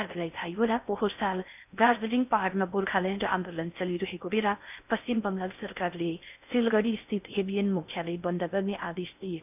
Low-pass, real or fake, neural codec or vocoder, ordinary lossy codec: 3.6 kHz; fake; codec, 16 kHz in and 24 kHz out, 0.6 kbps, FocalCodec, streaming, 4096 codes; none